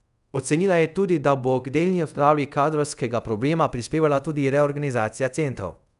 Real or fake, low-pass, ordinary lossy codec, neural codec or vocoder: fake; 10.8 kHz; none; codec, 24 kHz, 0.5 kbps, DualCodec